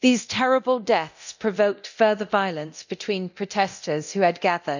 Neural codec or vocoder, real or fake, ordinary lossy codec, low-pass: codec, 24 kHz, 0.9 kbps, DualCodec; fake; none; 7.2 kHz